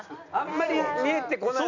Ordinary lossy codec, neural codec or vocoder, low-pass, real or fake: none; none; 7.2 kHz; real